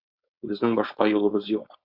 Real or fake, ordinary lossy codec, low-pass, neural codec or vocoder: fake; Opus, 64 kbps; 5.4 kHz; codec, 16 kHz, 4.8 kbps, FACodec